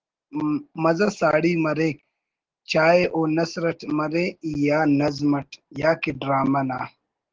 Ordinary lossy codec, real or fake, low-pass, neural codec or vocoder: Opus, 16 kbps; real; 7.2 kHz; none